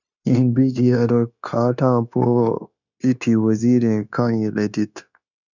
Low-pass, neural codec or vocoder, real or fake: 7.2 kHz; codec, 16 kHz, 0.9 kbps, LongCat-Audio-Codec; fake